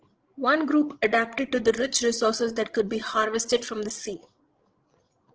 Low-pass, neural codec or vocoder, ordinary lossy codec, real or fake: 7.2 kHz; codec, 16 kHz, 16 kbps, FreqCodec, larger model; Opus, 16 kbps; fake